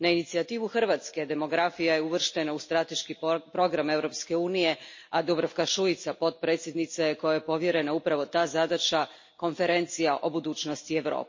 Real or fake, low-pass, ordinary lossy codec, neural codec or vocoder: real; 7.2 kHz; MP3, 32 kbps; none